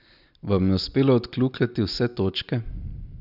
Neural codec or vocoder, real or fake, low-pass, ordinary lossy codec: none; real; 5.4 kHz; none